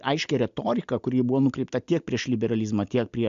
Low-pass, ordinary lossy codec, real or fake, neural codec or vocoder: 7.2 kHz; MP3, 64 kbps; real; none